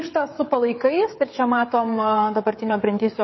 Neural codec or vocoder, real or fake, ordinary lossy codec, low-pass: codec, 16 kHz, 16 kbps, FreqCodec, smaller model; fake; MP3, 24 kbps; 7.2 kHz